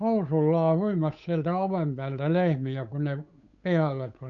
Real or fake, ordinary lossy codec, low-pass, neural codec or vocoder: fake; none; 7.2 kHz; codec, 16 kHz, 8 kbps, FunCodec, trained on Chinese and English, 25 frames a second